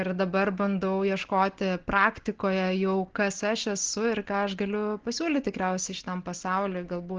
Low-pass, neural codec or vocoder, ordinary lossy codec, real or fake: 7.2 kHz; none; Opus, 16 kbps; real